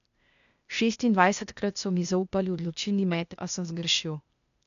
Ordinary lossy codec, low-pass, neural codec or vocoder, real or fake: none; 7.2 kHz; codec, 16 kHz, 0.8 kbps, ZipCodec; fake